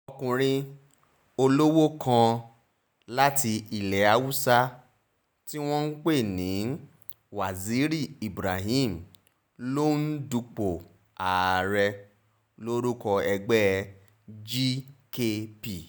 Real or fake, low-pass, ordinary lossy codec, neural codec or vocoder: real; none; none; none